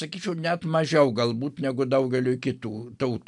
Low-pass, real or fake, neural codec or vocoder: 10.8 kHz; real; none